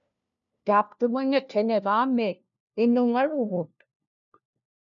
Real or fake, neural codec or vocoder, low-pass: fake; codec, 16 kHz, 1 kbps, FunCodec, trained on LibriTTS, 50 frames a second; 7.2 kHz